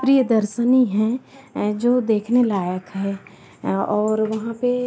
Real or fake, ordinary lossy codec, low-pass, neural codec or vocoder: real; none; none; none